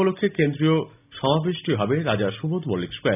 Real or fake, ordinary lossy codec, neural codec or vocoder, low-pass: real; none; none; 3.6 kHz